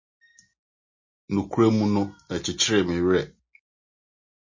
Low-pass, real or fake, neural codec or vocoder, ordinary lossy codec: 7.2 kHz; real; none; MP3, 32 kbps